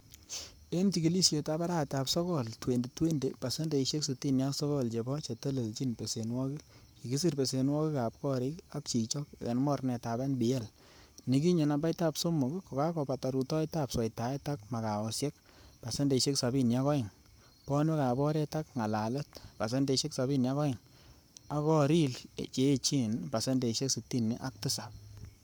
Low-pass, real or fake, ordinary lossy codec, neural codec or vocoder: none; fake; none; codec, 44.1 kHz, 7.8 kbps, Pupu-Codec